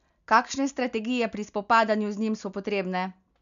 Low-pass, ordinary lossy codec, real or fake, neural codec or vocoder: 7.2 kHz; none; real; none